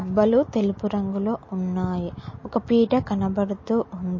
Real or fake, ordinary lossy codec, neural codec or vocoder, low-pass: real; MP3, 32 kbps; none; 7.2 kHz